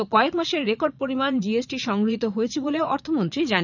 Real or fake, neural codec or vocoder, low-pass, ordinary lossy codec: fake; vocoder, 22.05 kHz, 80 mel bands, Vocos; 7.2 kHz; none